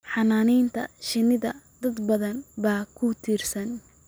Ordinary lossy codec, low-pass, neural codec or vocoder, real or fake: none; none; none; real